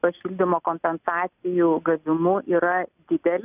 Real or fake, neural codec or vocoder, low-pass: real; none; 3.6 kHz